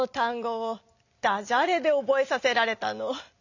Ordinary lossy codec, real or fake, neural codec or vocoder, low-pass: none; real; none; 7.2 kHz